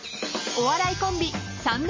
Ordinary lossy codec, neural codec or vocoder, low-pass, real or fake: MP3, 32 kbps; none; 7.2 kHz; real